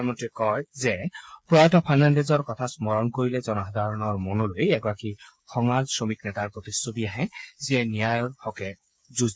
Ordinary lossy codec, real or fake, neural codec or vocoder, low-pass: none; fake; codec, 16 kHz, 8 kbps, FreqCodec, smaller model; none